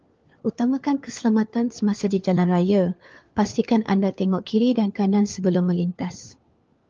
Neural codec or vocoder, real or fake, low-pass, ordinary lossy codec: codec, 16 kHz, 4 kbps, FunCodec, trained on LibriTTS, 50 frames a second; fake; 7.2 kHz; Opus, 24 kbps